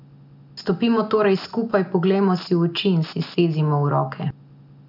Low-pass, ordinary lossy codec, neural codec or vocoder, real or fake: 5.4 kHz; none; none; real